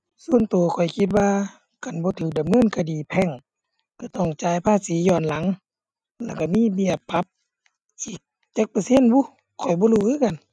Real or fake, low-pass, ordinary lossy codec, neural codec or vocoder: real; none; none; none